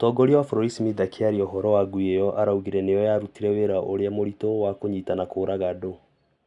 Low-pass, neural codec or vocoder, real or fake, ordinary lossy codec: 10.8 kHz; none; real; none